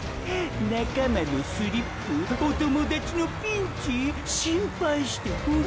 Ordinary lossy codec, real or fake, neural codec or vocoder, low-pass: none; real; none; none